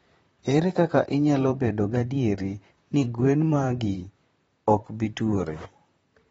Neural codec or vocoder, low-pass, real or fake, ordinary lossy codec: vocoder, 44.1 kHz, 128 mel bands, Pupu-Vocoder; 19.8 kHz; fake; AAC, 24 kbps